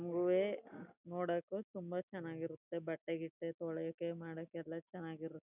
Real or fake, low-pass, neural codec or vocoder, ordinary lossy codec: real; 3.6 kHz; none; none